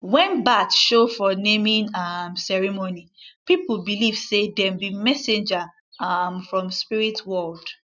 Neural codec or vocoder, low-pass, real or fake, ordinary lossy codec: none; 7.2 kHz; real; none